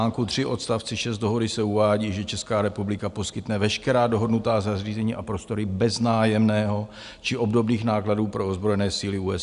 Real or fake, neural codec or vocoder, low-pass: real; none; 10.8 kHz